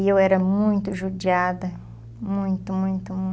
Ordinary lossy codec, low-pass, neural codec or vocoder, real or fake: none; none; none; real